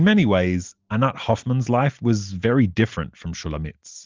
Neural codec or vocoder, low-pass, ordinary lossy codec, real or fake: none; 7.2 kHz; Opus, 32 kbps; real